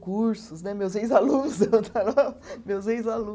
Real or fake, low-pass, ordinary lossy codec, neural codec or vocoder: real; none; none; none